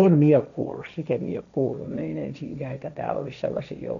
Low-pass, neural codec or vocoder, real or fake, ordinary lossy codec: 7.2 kHz; codec, 16 kHz, 1.1 kbps, Voila-Tokenizer; fake; none